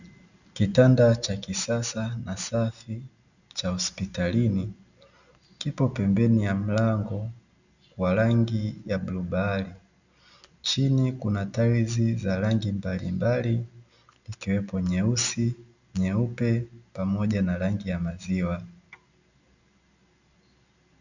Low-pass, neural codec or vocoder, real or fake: 7.2 kHz; none; real